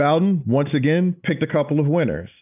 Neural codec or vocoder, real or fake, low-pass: none; real; 3.6 kHz